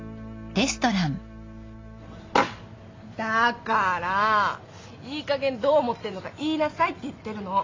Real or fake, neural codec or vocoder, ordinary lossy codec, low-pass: real; none; AAC, 32 kbps; 7.2 kHz